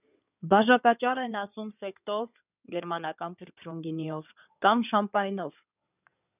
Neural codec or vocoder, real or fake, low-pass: codec, 16 kHz in and 24 kHz out, 2.2 kbps, FireRedTTS-2 codec; fake; 3.6 kHz